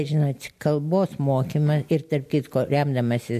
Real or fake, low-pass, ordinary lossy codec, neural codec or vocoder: real; 14.4 kHz; MP3, 64 kbps; none